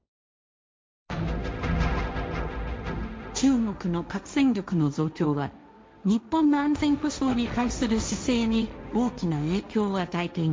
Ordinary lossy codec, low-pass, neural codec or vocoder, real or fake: none; none; codec, 16 kHz, 1.1 kbps, Voila-Tokenizer; fake